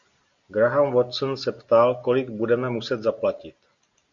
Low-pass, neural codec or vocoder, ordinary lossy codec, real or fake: 7.2 kHz; none; Opus, 64 kbps; real